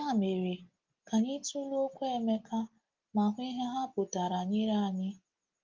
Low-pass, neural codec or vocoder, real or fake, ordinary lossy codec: 7.2 kHz; none; real; Opus, 32 kbps